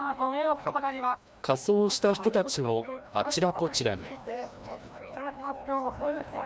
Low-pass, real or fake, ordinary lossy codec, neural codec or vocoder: none; fake; none; codec, 16 kHz, 1 kbps, FreqCodec, larger model